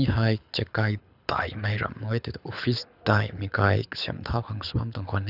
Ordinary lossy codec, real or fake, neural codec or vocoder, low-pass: none; fake; codec, 24 kHz, 6 kbps, HILCodec; 5.4 kHz